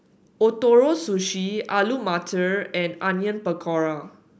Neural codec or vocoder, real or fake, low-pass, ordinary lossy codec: none; real; none; none